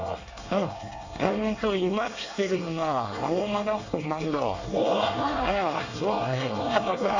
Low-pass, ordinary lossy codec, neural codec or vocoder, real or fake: 7.2 kHz; AAC, 48 kbps; codec, 24 kHz, 1 kbps, SNAC; fake